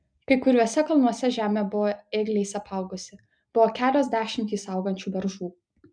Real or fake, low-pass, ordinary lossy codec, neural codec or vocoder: real; 9.9 kHz; MP3, 96 kbps; none